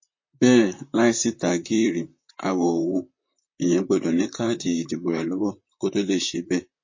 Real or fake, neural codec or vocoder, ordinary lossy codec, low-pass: fake; vocoder, 44.1 kHz, 128 mel bands, Pupu-Vocoder; MP3, 32 kbps; 7.2 kHz